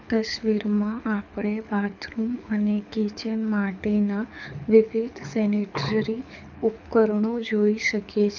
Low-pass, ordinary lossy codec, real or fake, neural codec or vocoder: 7.2 kHz; none; fake; codec, 24 kHz, 6 kbps, HILCodec